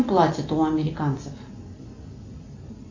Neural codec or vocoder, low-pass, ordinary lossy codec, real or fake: none; 7.2 kHz; AAC, 48 kbps; real